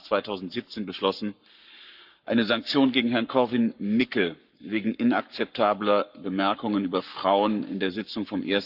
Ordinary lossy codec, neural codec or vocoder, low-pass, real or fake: none; codec, 44.1 kHz, 7.8 kbps, Pupu-Codec; 5.4 kHz; fake